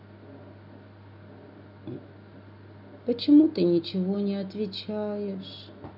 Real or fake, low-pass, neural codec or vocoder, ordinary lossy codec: real; 5.4 kHz; none; none